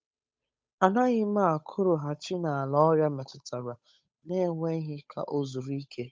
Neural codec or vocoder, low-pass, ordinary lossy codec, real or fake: codec, 16 kHz, 8 kbps, FunCodec, trained on Chinese and English, 25 frames a second; none; none; fake